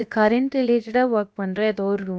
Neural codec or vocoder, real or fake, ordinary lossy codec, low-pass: codec, 16 kHz, about 1 kbps, DyCAST, with the encoder's durations; fake; none; none